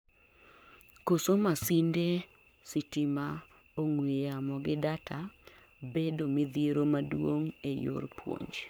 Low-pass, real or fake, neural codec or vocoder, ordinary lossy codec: none; fake; codec, 44.1 kHz, 7.8 kbps, Pupu-Codec; none